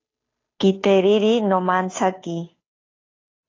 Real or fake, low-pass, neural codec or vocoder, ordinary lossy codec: fake; 7.2 kHz; codec, 16 kHz, 2 kbps, FunCodec, trained on Chinese and English, 25 frames a second; AAC, 32 kbps